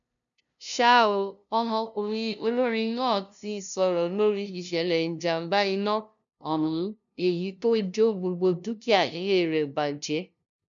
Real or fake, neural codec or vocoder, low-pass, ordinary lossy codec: fake; codec, 16 kHz, 0.5 kbps, FunCodec, trained on LibriTTS, 25 frames a second; 7.2 kHz; none